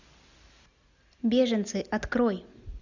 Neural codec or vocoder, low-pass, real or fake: none; 7.2 kHz; real